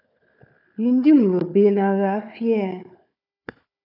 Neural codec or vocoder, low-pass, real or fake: codec, 16 kHz, 4 kbps, FunCodec, trained on Chinese and English, 50 frames a second; 5.4 kHz; fake